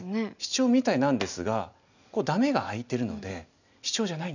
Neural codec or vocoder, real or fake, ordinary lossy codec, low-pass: none; real; none; 7.2 kHz